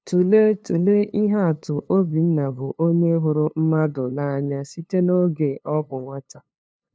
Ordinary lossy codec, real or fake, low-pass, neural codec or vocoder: none; fake; none; codec, 16 kHz, 2 kbps, FunCodec, trained on LibriTTS, 25 frames a second